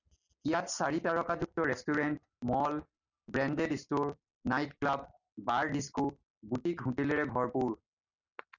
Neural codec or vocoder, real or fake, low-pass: none; real; 7.2 kHz